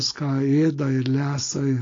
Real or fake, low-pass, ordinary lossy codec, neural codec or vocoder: real; 7.2 kHz; AAC, 32 kbps; none